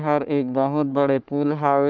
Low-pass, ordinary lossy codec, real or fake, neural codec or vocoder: 7.2 kHz; none; fake; codec, 44.1 kHz, 3.4 kbps, Pupu-Codec